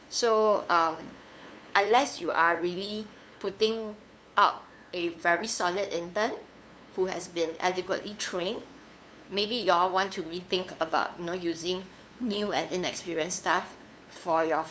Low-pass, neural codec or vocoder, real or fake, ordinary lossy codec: none; codec, 16 kHz, 2 kbps, FunCodec, trained on LibriTTS, 25 frames a second; fake; none